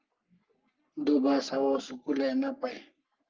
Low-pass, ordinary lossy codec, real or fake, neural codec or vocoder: 7.2 kHz; Opus, 24 kbps; fake; codec, 44.1 kHz, 3.4 kbps, Pupu-Codec